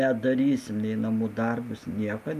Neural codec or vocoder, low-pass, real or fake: none; 14.4 kHz; real